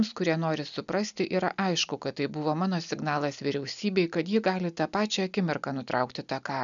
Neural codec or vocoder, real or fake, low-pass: none; real; 7.2 kHz